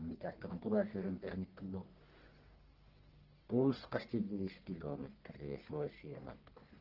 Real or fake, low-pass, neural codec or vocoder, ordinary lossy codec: fake; 5.4 kHz; codec, 44.1 kHz, 1.7 kbps, Pupu-Codec; Opus, 16 kbps